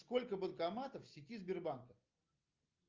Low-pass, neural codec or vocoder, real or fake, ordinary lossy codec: 7.2 kHz; none; real; Opus, 24 kbps